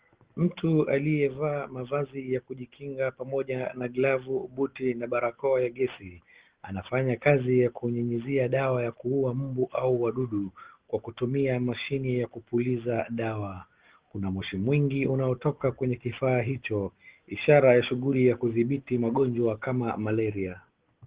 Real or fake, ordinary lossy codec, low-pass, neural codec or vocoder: real; Opus, 16 kbps; 3.6 kHz; none